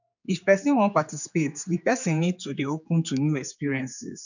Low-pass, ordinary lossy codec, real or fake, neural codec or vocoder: 7.2 kHz; none; fake; codec, 16 kHz, 4 kbps, X-Codec, HuBERT features, trained on general audio